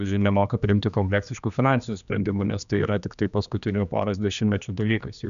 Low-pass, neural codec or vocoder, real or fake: 7.2 kHz; codec, 16 kHz, 2 kbps, X-Codec, HuBERT features, trained on general audio; fake